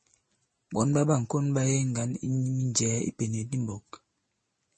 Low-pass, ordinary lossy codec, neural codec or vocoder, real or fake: 10.8 kHz; MP3, 32 kbps; none; real